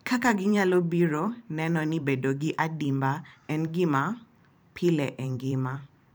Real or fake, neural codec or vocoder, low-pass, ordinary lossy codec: fake; vocoder, 44.1 kHz, 128 mel bands every 512 samples, BigVGAN v2; none; none